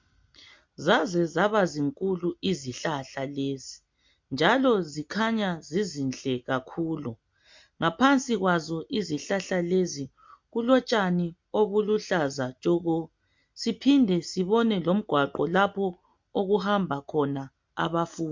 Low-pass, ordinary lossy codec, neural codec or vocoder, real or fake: 7.2 kHz; MP3, 48 kbps; none; real